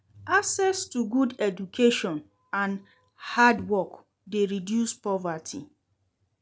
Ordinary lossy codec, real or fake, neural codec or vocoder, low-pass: none; real; none; none